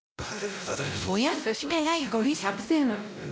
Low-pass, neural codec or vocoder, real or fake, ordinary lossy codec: none; codec, 16 kHz, 0.5 kbps, X-Codec, WavLM features, trained on Multilingual LibriSpeech; fake; none